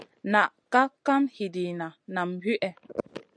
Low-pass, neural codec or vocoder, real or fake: 9.9 kHz; none; real